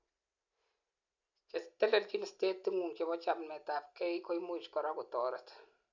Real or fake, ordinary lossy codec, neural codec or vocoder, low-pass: real; none; none; 7.2 kHz